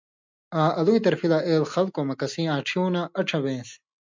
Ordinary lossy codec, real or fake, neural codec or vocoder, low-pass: MP3, 64 kbps; real; none; 7.2 kHz